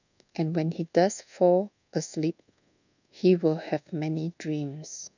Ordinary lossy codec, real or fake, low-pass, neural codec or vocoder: none; fake; 7.2 kHz; codec, 24 kHz, 1.2 kbps, DualCodec